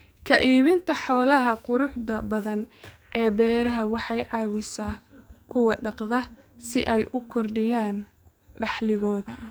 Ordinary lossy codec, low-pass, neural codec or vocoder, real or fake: none; none; codec, 44.1 kHz, 2.6 kbps, SNAC; fake